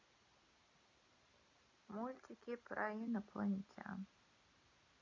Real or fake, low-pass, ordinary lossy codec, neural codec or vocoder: real; 7.2 kHz; none; none